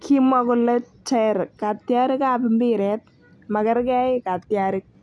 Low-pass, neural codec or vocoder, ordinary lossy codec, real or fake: none; none; none; real